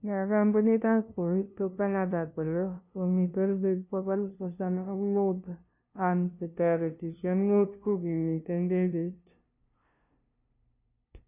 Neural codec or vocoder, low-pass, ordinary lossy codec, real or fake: codec, 16 kHz, 0.5 kbps, FunCodec, trained on LibriTTS, 25 frames a second; 3.6 kHz; none; fake